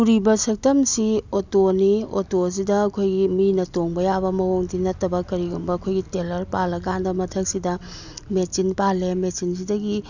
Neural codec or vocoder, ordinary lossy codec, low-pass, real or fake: none; none; 7.2 kHz; real